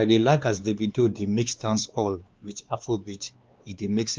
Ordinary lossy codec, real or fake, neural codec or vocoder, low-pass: Opus, 24 kbps; fake; codec, 16 kHz, 2 kbps, X-Codec, WavLM features, trained on Multilingual LibriSpeech; 7.2 kHz